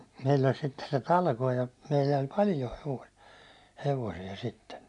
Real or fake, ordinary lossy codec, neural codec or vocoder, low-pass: real; none; none; 10.8 kHz